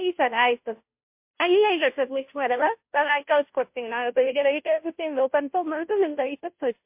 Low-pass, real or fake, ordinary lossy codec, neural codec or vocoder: 3.6 kHz; fake; MP3, 32 kbps; codec, 16 kHz, 0.5 kbps, FunCodec, trained on Chinese and English, 25 frames a second